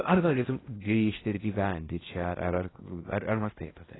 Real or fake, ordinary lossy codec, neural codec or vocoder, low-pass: fake; AAC, 16 kbps; codec, 16 kHz in and 24 kHz out, 0.8 kbps, FocalCodec, streaming, 65536 codes; 7.2 kHz